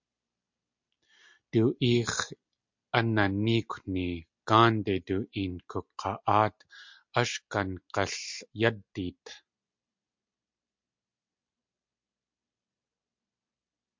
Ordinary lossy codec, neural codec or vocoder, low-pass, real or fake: MP3, 64 kbps; none; 7.2 kHz; real